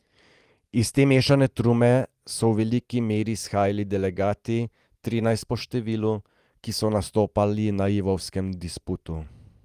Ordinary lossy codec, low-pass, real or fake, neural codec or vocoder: Opus, 24 kbps; 14.4 kHz; real; none